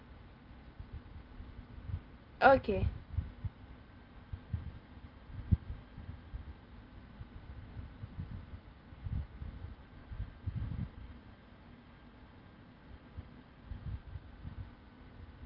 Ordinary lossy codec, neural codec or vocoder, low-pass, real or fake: Opus, 32 kbps; none; 5.4 kHz; real